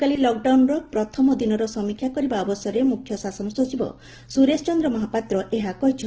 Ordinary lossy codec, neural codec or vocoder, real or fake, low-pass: Opus, 16 kbps; none; real; 7.2 kHz